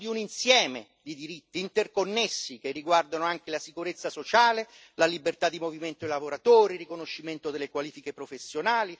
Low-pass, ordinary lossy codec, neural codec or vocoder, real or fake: none; none; none; real